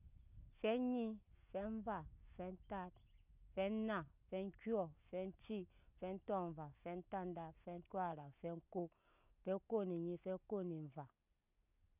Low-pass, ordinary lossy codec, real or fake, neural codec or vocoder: 3.6 kHz; none; real; none